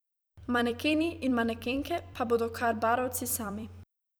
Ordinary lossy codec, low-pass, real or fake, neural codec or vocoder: none; none; fake; vocoder, 44.1 kHz, 128 mel bands every 256 samples, BigVGAN v2